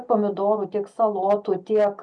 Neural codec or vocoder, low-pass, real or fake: none; 10.8 kHz; real